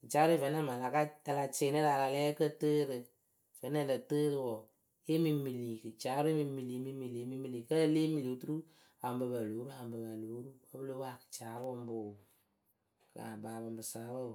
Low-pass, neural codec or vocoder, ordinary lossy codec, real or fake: none; none; none; real